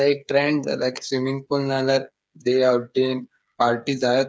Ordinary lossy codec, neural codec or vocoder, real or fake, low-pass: none; codec, 16 kHz, 8 kbps, FreqCodec, smaller model; fake; none